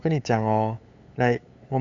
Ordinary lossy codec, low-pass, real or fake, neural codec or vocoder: Opus, 64 kbps; 7.2 kHz; fake; codec, 16 kHz, 8 kbps, FunCodec, trained on LibriTTS, 25 frames a second